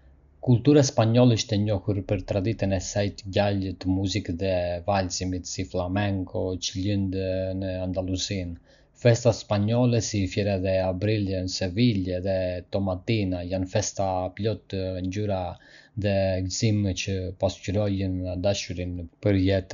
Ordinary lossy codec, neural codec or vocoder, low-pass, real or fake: none; none; 7.2 kHz; real